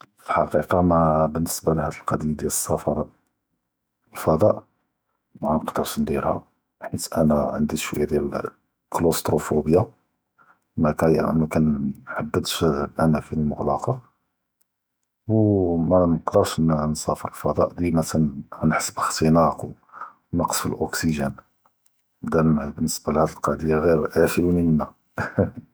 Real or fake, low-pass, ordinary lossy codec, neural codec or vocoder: fake; none; none; autoencoder, 48 kHz, 128 numbers a frame, DAC-VAE, trained on Japanese speech